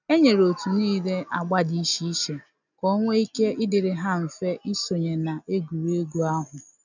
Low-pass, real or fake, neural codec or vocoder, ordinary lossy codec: 7.2 kHz; real; none; none